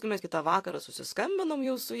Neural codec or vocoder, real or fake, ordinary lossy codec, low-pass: vocoder, 44.1 kHz, 128 mel bands every 256 samples, BigVGAN v2; fake; AAC, 64 kbps; 14.4 kHz